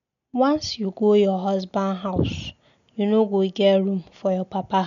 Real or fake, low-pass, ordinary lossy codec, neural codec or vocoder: real; 7.2 kHz; none; none